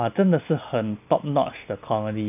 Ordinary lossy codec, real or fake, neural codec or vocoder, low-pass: none; real; none; 3.6 kHz